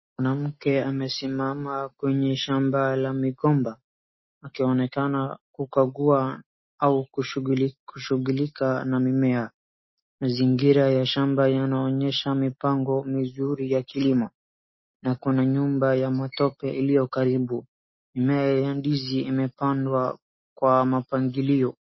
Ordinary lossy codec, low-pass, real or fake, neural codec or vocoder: MP3, 24 kbps; 7.2 kHz; real; none